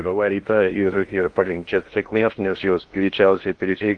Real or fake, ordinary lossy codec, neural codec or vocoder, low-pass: fake; Opus, 24 kbps; codec, 16 kHz in and 24 kHz out, 0.6 kbps, FocalCodec, streaming, 2048 codes; 9.9 kHz